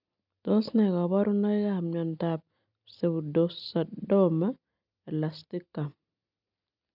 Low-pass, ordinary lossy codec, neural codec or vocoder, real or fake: 5.4 kHz; none; none; real